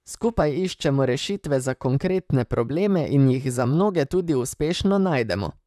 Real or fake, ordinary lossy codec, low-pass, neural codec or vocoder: fake; none; 14.4 kHz; vocoder, 44.1 kHz, 128 mel bands, Pupu-Vocoder